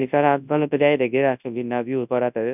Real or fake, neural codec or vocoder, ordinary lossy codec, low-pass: fake; codec, 24 kHz, 0.9 kbps, WavTokenizer, large speech release; none; 3.6 kHz